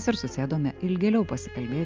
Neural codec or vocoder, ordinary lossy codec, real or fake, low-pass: none; Opus, 24 kbps; real; 7.2 kHz